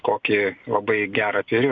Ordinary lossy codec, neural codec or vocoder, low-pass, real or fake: MP3, 64 kbps; none; 7.2 kHz; real